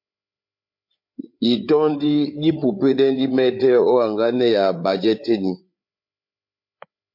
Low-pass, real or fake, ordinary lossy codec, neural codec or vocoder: 5.4 kHz; fake; MP3, 32 kbps; codec, 16 kHz, 8 kbps, FreqCodec, larger model